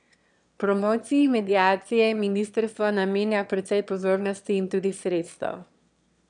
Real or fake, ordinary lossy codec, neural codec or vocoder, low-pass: fake; none; autoencoder, 22.05 kHz, a latent of 192 numbers a frame, VITS, trained on one speaker; 9.9 kHz